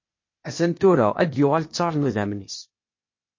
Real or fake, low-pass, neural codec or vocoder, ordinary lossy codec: fake; 7.2 kHz; codec, 16 kHz, 0.8 kbps, ZipCodec; MP3, 32 kbps